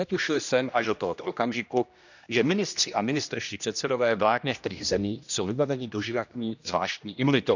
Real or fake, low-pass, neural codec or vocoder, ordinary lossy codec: fake; 7.2 kHz; codec, 16 kHz, 1 kbps, X-Codec, HuBERT features, trained on general audio; none